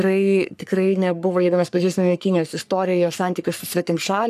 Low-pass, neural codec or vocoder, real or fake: 14.4 kHz; codec, 44.1 kHz, 3.4 kbps, Pupu-Codec; fake